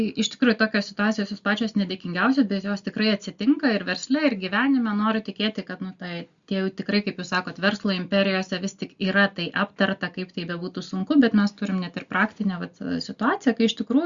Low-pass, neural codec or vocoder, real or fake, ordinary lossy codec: 7.2 kHz; none; real; Opus, 64 kbps